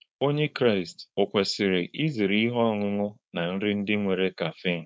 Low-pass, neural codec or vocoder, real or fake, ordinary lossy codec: none; codec, 16 kHz, 4.8 kbps, FACodec; fake; none